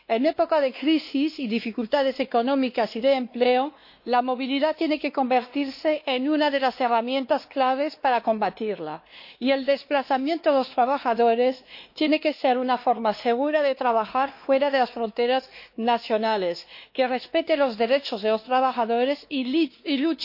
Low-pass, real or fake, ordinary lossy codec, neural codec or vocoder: 5.4 kHz; fake; MP3, 32 kbps; codec, 16 kHz, 2 kbps, X-Codec, WavLM features, trained on Multilingual LibriSpeech